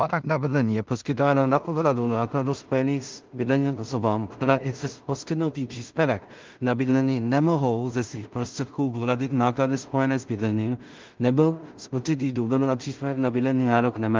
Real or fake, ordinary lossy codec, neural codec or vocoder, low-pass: fake; Opus, 32 kbps; codec, 16 kHz in and 24 kHz out, 0.4 kbps, LongCat-Audio-Codec, two codebook decoder; 7.2 kHz